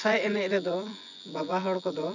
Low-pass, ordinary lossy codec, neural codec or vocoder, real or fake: 7.2 kHz; none; vocoder, 24 kHz, 100 mel bands, Vocos; fake